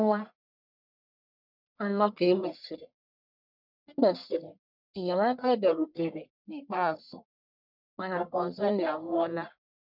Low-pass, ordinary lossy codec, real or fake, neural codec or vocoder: 5.4 kHz; none; fake; codec, 44.1 kHz, 1.7 kbps, Pupu-Codec